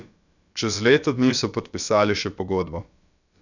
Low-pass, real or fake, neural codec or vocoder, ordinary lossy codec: 7.2 kHz; fake; codec, 16 kHz, about 1 kbps, DyCAST, with the encoder's durations; none